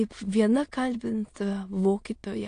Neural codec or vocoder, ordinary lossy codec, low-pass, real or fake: autoencoder, 22.05 kHz, a latent of 192 numbers a frame, VITS, trained on many speakers; AAC, 48 kbps; 9.9 kHz; fake